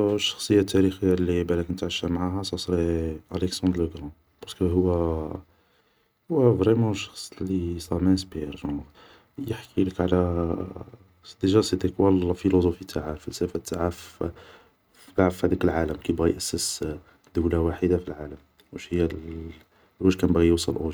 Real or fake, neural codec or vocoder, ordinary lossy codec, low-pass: real; none; none; none